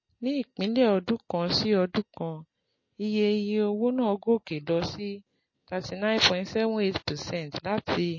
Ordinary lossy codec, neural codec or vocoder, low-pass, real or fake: MP3, 32 kbps; none; 7.2 kHz; real